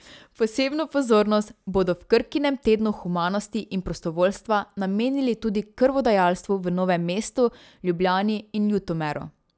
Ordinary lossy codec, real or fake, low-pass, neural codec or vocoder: none; real; none; none